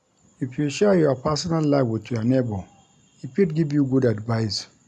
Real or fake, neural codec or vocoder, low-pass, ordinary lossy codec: real; none; none; none